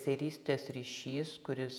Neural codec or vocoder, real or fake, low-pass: vocoder, 48 kHz, 128 mel bands, Vocos; fake; 19.8 kHz